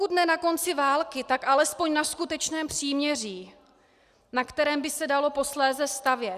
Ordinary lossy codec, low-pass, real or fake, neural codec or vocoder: Opus, 64 kbps; 14.4 kHz; real; none